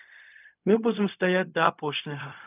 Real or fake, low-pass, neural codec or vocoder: fake; 3.6 kHz; codec, 16 kHz, 0.4 kbps, LongCat-Audio-Codec